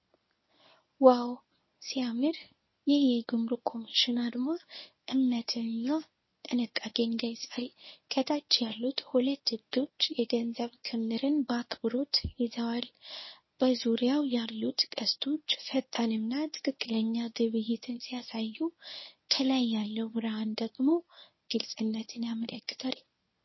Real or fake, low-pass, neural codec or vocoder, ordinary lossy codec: fake; 7.2 kHz; codec, 24 kHz, 0.9 kbps, WavTokenizer, medium speech release version 1; MP3, 24 kbps